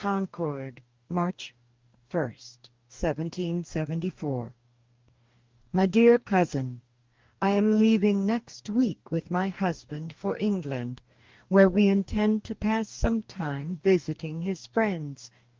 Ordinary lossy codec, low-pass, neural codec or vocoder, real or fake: Opus, 32 kbps; 7.2 kHz; codec, 44.1 kHz, 2.6 kbps, DAC; fake